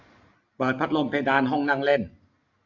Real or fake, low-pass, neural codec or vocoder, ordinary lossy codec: real; 7.2 kHz; none; AAC, 48 kbps